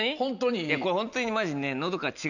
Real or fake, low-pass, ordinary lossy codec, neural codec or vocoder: real; 7.2 kHz; none; none